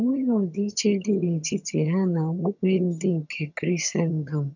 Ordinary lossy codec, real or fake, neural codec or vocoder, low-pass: none; fake; vocoder, 22.05 kHz, 80 mel bands, HiFi-GAN; 7.2 kHz